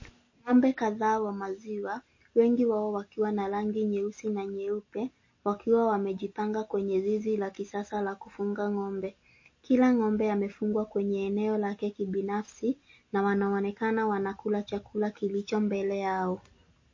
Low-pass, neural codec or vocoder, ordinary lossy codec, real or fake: 7.2 kHz; none; MP3, 32 kbps; real